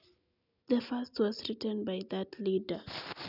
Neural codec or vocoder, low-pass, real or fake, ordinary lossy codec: none; 5.4 kHz; real; none